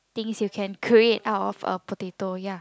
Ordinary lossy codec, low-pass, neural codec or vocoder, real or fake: none; none; none; real